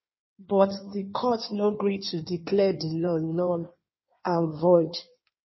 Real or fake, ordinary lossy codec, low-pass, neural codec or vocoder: fake; MP3, 24 kbps; 7.2 kHz; codec, 16 kHz in and 24 kHz out, 1.1 kbps, FireRedTTS-2 codec